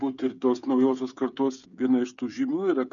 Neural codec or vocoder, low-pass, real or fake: codec, 16 kHz, 8 kbps, FreqCodec, smaller model; 7.2 kHz; fake